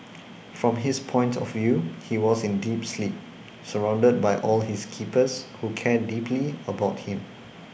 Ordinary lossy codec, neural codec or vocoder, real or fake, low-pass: none; none; real; none